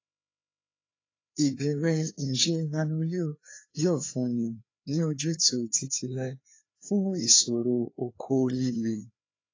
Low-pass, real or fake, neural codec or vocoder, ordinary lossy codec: 7.2 kHz; fake; codec, 16 kHz, 2 kbps, FreqCodec, larger model; AAC, 32 kbps